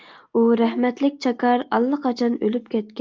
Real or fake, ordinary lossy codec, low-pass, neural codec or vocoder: real; Opus, 24 kbps; 7.2 kHz; none